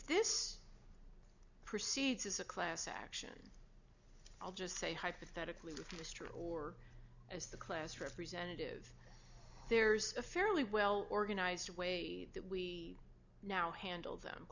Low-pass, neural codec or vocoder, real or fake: 7.2 kHz; none; real